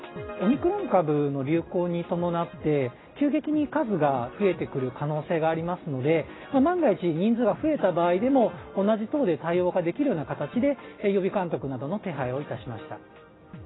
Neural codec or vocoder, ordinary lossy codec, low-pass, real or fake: none; AAC, 16 kbps; 7.2 kHz; real